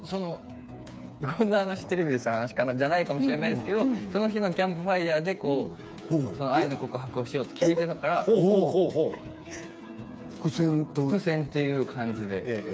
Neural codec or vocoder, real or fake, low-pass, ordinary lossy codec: codec, 16 kHz, 4 kbps, FreqCodec, smaller model; fake; none; none